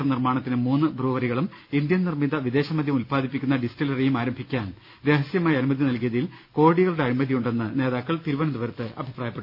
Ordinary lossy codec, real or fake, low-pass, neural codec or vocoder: none; real; 5.4 kHz; none